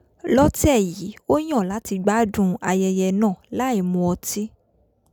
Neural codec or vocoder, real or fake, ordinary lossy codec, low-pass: none; real; none; 19.8 kHz